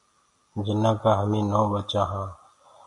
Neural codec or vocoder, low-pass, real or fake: none; 10.8 kHz; real